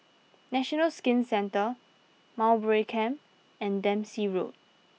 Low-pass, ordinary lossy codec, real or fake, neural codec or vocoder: none; none; real; none